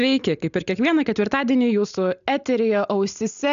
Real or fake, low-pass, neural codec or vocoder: real; 7.2 kHz; none